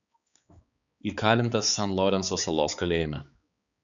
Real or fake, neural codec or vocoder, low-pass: fake; codec, 16 kHz, 4 kbps, X-Codec, HuBERT features, trained on balanced general audio; 7.2 kHz